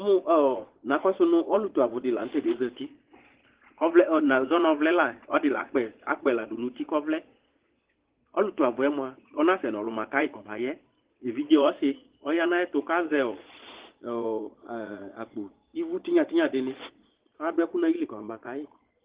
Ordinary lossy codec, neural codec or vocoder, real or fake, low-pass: Opus, 16 kbps; vocoder, 22.05 kHz, 80 mel bands, Vocos; fake; 3.6 kHz